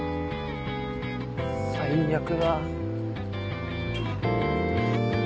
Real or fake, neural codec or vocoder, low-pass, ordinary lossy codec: real; none; none; none